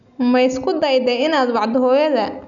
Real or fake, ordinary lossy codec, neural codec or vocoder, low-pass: real; none; none; 7.2 kHz